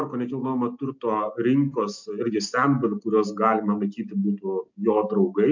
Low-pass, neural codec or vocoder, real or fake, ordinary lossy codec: 7.2 kHz; none; real; MP3, 64 kbps